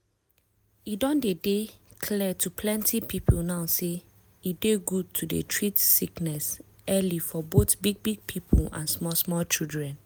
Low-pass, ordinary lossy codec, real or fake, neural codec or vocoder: none; none; real; none